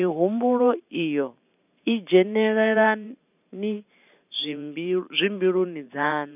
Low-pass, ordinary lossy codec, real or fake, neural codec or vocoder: 3.6 kHz; none; fake; vocoder, 44.1 kHz, 128 mel bands every 512 samples, BigVGAN v2